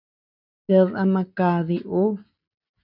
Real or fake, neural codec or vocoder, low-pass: real; none; 5.4 kHz